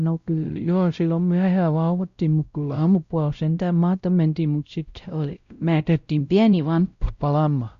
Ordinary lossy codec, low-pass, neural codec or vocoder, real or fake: Opus, 64 kbps; 7.2 kHz; codec, 16 kHz, 0.5 kbps, X-Codec, WavLM features, trained on Multilingual LibriSpeech; fake